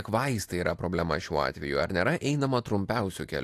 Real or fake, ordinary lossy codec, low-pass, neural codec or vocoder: real; AAC, 64 kbps; 14.4 kHz; none